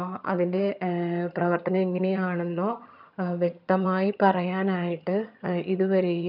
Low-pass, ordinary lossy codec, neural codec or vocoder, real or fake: 5.4 kHz; none; vocoder, 22.05 kHz, 80 mel bands, HiFi-GAN; fake